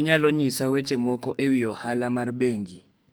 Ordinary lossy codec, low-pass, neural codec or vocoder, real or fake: none; none; codec, 44.1 kHz, 2.6 kbps, SNAC; fake